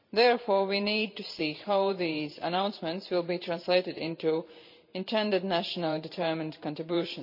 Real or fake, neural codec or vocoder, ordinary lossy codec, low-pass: fake; vocoder, 44.1 kHz, 128 mel bands every 512 samples, BigVGAN v2; none; 5.4 kHz